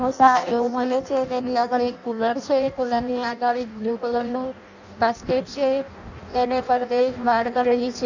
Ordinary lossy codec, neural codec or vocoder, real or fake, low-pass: none; codec, 16 kHz in and 24 kHz out, 0.6 kbps, FireRedTTS-2 codec; fake; 7.2 kHz